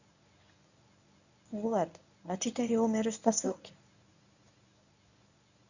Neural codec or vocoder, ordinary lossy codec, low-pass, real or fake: codec, 24 kHz, 0.9 kbps, WavTokenizer, medium speech release version 1; none; 7.2 kHz; fake